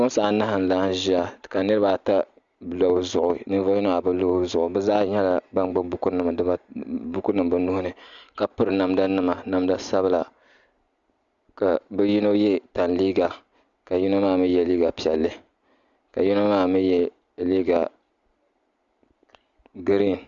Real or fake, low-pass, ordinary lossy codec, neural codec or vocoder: real; 7.2 kHz; Opus, 64 kbps; none